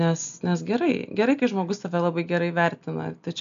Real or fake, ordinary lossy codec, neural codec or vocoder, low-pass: real; AAC, 96 kbps; none; 7.2 kHz